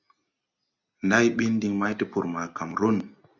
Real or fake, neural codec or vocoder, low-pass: real; none; 7.2 kHz